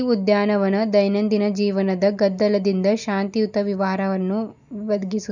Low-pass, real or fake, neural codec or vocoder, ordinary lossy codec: 7.2 kHz; real; none; none